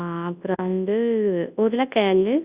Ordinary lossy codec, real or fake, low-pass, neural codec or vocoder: Opus, 64 kbps; fake; 3.6 kHz; codec, 24 kHz, 0.9 kbps, WavTokenizer, large speech release